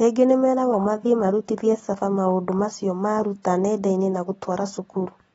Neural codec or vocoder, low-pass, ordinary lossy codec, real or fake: none; 14.4 kHz; AAC, 24 kbps; real